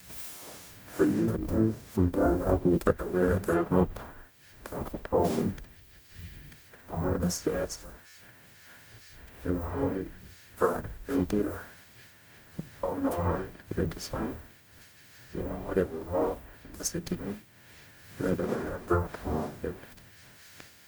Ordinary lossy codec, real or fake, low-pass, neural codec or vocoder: none; fake; none; codec, 44.1 kHz, 0.9 kbps, DAC